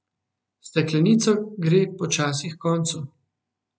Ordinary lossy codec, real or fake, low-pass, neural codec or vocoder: none; real; none; none